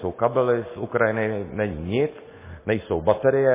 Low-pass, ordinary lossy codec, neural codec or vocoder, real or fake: 3.6 kHz; MP3, 16 kbps; none; real